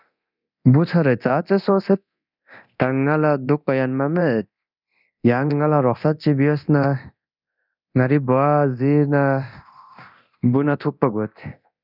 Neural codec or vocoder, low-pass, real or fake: codec, 24 kHz, 0.9 kbps, DualCodec; 5.4 kHz; fake